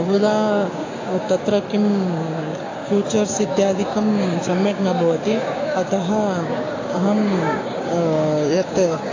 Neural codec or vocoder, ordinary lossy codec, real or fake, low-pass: codec, 16 kHz, 6 kbps, DAC; AAC, 32 kbps; fake; 7.2 kHz